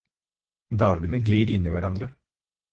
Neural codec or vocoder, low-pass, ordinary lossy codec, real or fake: codec, 24 kHz, 1.5 kbps, HILCodec; 9.9 kHz; Opus, 16 kbps; fake